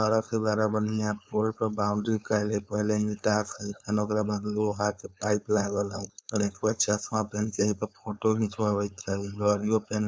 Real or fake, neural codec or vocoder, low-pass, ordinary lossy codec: fake; codec, 16 kHz, 4.8 kbps, FACodec; none; none